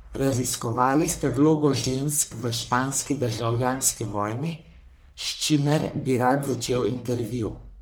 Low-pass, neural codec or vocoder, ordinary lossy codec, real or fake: none; codec, 44.1 kHz, 1.7 kbps, Pupu-Codec; none; fake